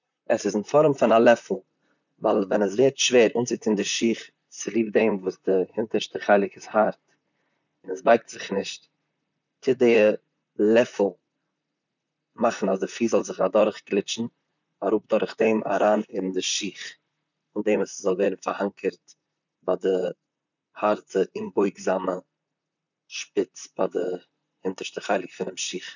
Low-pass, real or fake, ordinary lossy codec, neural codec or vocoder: 7.2 kHz; fake; none; vocoder, 44.1 kHz, 128 mel bands, Pupu-Vocoder